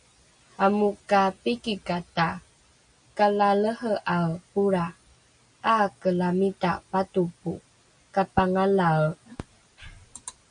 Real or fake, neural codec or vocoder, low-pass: real; none; 9.9 kHz